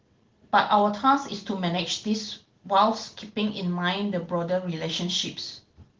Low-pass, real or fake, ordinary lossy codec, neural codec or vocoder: 7.2 kHz; real; Opus, 16 kbps; none